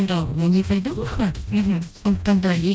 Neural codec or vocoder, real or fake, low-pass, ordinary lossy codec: codec, 16 kHz, 1 kbps, FreqCodec, smaller model; fake; none; none